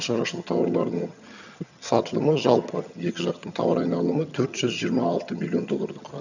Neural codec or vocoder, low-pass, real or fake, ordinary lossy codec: vocoder, 22.05 kHz, 80 mel bands, HiFi-GAN; 7.2 kHz; fake; none